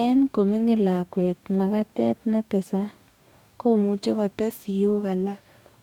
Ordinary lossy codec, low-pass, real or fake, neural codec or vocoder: none; 19.8 kHz; fake; codec, 44.1 kHz, 2.6 kbps, DAC